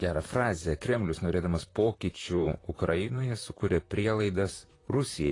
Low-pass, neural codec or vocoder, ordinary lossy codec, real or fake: 10.8 kHz; vocoder, 44.1 kHz, 128 mel bands, Pupu-Vocoder; AAC, 32 kbps; fake